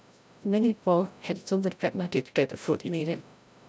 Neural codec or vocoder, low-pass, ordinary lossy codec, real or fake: codec, 16 kHz, 0.5 kbps, FreqCodec, larger model; none; none; fake